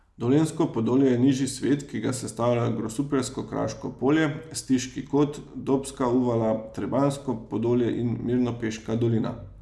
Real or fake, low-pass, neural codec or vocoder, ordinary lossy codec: real; none; none; none